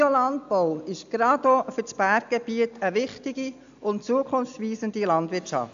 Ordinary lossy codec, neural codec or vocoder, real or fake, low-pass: AAC, 64 kbps; none; real; 7.2 kHz